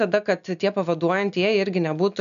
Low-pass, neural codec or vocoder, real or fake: 7.2 kHz; none; real